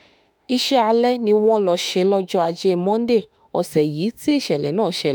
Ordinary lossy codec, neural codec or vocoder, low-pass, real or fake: none; autoencoder, 48 kHz, 32 numbers a frame, DAC-VAE, trained on Japanese speech; none; fake